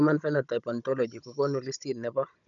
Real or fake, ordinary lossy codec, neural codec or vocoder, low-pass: fake; none; codec, 16 kHz, 16 kbps, FunCodec, trained on Chinese and English, 50 frames a second; 7.2 kHz